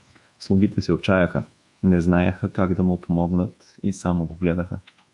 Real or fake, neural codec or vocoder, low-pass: fake; codec, 24 kHz, 1.2 kbps, DualCodec; 10.8 kHz